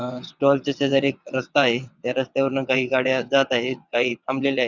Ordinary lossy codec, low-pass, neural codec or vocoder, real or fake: Opus, 64 kbps; 7.2 kHz; vocoder, 22.05 kHz, 80 mel bands, WaveNeXt; fake